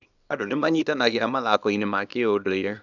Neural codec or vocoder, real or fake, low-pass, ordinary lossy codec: codec, 24 kHz, 0.9 kbps, WavTokenizer, small release; fake; 7.2 kHz; none